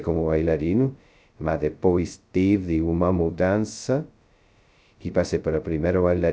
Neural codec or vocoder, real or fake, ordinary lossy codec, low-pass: codec, 16 kHz, 0.2 kbps, FocalCodec; fake; none; none